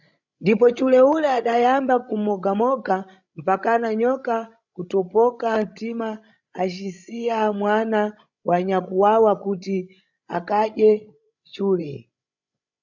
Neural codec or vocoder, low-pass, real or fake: codec, 16 kHz, 16 kbps, FreqCodec, larger model; 7.2 kHz; fake